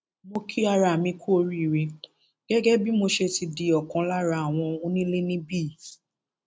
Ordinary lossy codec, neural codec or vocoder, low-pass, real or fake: none; none; none; real